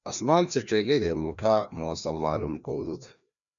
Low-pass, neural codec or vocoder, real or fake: 7.2 kHz; codec, 16 kHz, 1 kbps, FreqCodec, larger model; fake